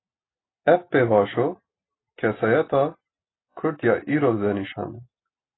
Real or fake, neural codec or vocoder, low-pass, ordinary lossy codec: real; none; 7.2 kHz; AAC, 16 kbps